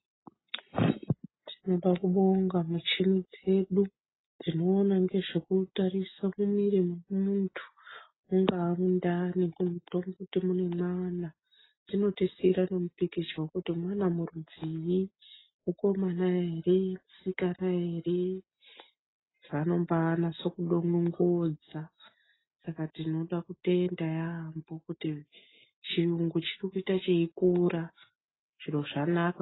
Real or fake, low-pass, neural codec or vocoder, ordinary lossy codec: real; 7.2 kHz; none; AAC, 16 kbps